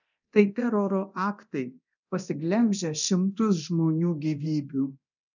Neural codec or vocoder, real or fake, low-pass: codec, 24 kHz, 0.9 kbps, DualCodec; fake; 7.2 kHz